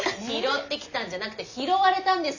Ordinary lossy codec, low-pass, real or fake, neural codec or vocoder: none; 7.2 kHz; real; none